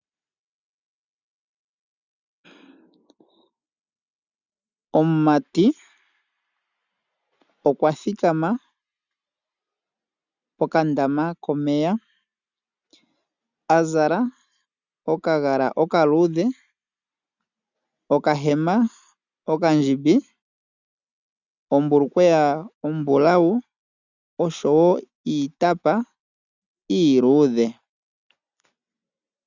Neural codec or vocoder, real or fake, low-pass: none; real; 7.2 kHz